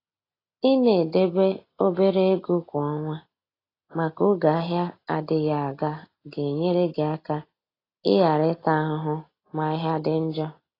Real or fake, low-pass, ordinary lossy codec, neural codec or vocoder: real; 5.4 kHz; AAC, 24 kbps; none